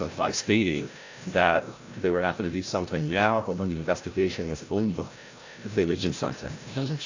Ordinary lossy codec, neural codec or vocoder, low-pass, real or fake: AAC, 48 kbps; codec, 16 kHz, 0.5 kbps, FreqCodec, larger model; 7.2 kHz; fake